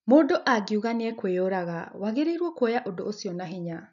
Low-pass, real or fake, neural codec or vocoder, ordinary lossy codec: 7.2 kHz; real; none; none